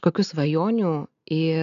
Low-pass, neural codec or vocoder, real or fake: 7.2 kHz; none; real